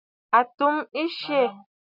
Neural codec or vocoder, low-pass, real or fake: none; 5.4 kHz; real